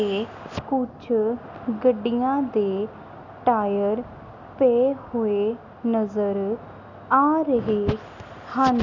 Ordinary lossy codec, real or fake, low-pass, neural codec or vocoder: none; real; 7.2 kHz; none